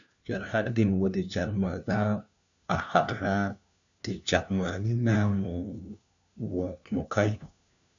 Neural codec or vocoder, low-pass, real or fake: codec, 16 kHz, 1 kbps, FunCodec, trained on LibriTTS, 50 frames a second; 7.2 kHz; fake